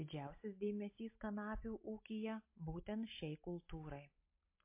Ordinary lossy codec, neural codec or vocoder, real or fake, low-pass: MP3, 24 kbps; none; real; 3.6 kHz